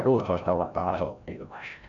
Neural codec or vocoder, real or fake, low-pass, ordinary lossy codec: codec, 16 kHz, 0.5 kbps, FreqCodec, larger model; fake; 7.2 kHz; none